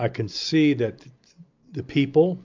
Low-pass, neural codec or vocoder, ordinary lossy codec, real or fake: 7.2 kHz; none; MP3, 64 kbps; real